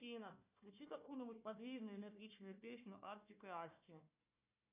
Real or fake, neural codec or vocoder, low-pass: fake; codec, 16 kHz, 1 kbps, FunCodec, trained on Chinese and English, 50 frames a second; 3.6 kHz